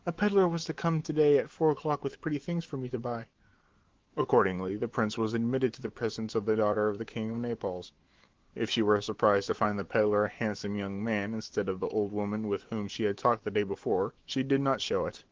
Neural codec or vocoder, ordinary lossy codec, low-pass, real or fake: autoencoder, 48 kHz, 128 numbers a frame, DAC-VAE, trained on Japanese speech; Opus, 16 kbps; 7.2 kHz; fake